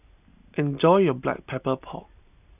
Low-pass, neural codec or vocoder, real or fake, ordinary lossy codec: 3.6 kHz; none; real; none